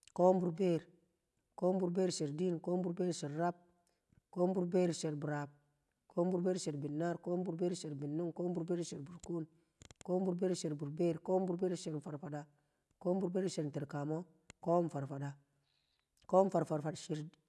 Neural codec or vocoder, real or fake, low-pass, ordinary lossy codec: none; real; none; none